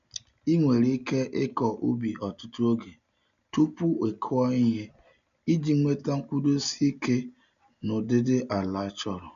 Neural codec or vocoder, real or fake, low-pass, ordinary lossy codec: none; real; 7.2 kHz; none